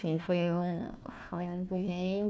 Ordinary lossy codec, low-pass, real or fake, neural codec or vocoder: none; none; fake; codec, 16 kHz, 1 kbps, FunCodec, trained on Chinese and English, 50 frames a second